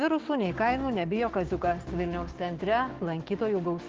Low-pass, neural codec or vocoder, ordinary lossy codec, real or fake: 7.2 kHz; codec, 16 kHz, 6 kbps, DAC; Opus, 16 kbps; fake